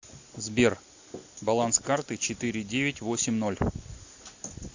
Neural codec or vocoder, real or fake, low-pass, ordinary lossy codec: none; real; 7.2 kHz; AAC, 48 kbps